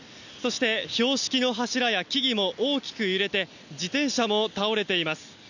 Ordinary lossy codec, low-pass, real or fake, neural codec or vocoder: none; 7.2 kHz; real; none